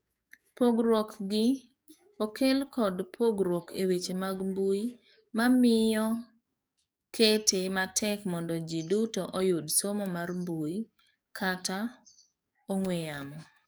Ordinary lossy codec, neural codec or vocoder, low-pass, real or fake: none; codec, 44.1 kHz, 7.8 kbps, DAC; none; fake